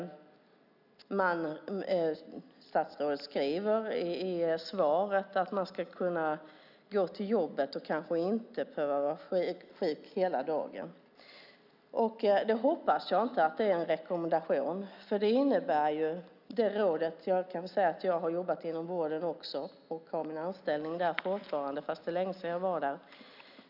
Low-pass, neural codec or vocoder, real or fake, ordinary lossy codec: 5.4 kHz; none; real; none